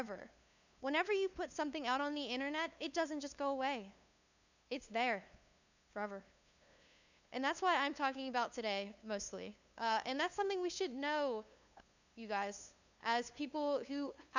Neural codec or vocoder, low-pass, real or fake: codec, 16 kHz, 2 kbps, FunCodec, trained on LibriTTS, 25 frames a second; 7.2 kHz; fake